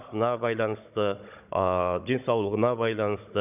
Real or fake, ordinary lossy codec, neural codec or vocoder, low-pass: fake; none; codec, 16 kHz, 16 kbps, FunCodec, trained on Chinese and English, 50 frames a second; 3.6 kHz